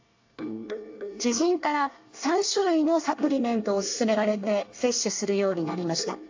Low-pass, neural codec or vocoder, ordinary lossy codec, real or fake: 7.2 kHz; codec, 24 kHz, 1 kbps, SNAC; AAC, 48 kbps; fake